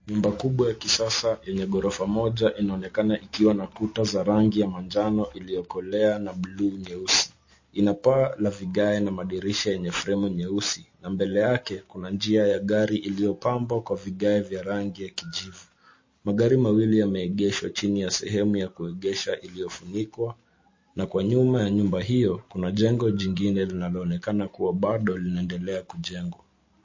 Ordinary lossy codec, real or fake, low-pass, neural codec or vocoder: MP3, 32 kbps; real; 7.2 kHz; none